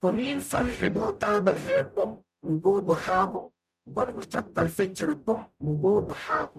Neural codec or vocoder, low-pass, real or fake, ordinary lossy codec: codec, 44.1 kHz, 0.9 kbps, DAC; 14.4 kHz; fake; none